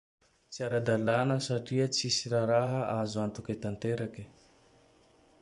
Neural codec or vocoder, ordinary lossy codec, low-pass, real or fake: vocoder, 24 kHz, 100 mel bands, Vocos; none; 10.8 kHz; fake